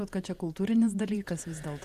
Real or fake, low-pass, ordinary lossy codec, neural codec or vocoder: fake; 14.4 kHz; Opus, 64 kbps; vocoder, 48 kHz, 128 mel bands, Vocos